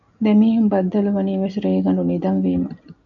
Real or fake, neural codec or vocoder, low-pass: real; none; 7.2 kHz